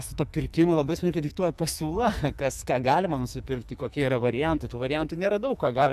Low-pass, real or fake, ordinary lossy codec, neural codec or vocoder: 14.4 kHz; fake; AAC, 96 kbps; codec, 44.1 kHz, 2.6 kbps, SNAC